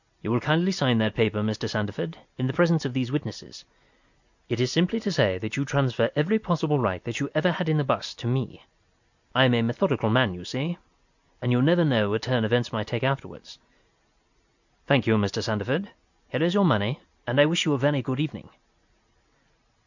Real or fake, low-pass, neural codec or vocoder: real; 7.2 kHz; none